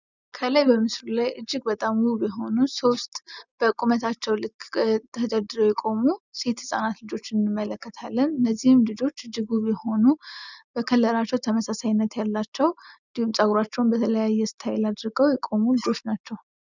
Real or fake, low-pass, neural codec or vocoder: real; 7.2 kHz; none